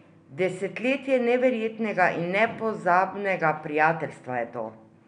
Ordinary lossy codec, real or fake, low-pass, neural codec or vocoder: none; real; 9.9 kHz; none